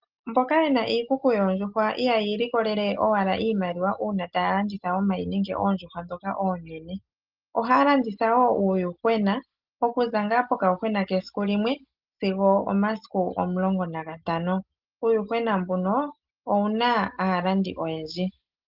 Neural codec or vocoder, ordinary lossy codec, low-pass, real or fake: none; Opus, 32 kbps; 5.4 kHz; real